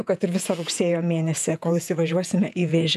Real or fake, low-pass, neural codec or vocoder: fake; 14.4 kHz; vocoder, 48 kHz, 128 mel bands, Vocos